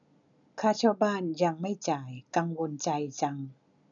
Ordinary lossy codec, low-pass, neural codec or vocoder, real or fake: none; 7.2 kHz; none; real